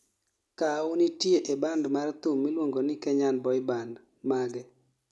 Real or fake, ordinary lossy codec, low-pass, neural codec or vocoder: real; none; none; none